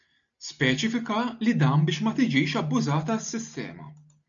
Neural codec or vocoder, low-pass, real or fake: none; 7.2 kHz; real